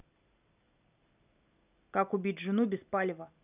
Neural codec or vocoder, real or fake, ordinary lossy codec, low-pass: none; real; none; 3.6 kHz